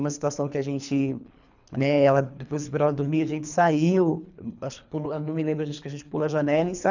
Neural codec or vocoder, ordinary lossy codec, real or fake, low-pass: codec, 24 kHz, 3 kbps, HILCodec; none; fake; 7.2 kHz